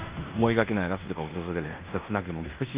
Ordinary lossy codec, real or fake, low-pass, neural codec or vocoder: Opus, 24 kbps; fake; 3.6 kHz; codec, 16 kHz in and 24 kHz out, 0.9 kbps, LongCat-Audio-Codec, fine tuned four codebook decoder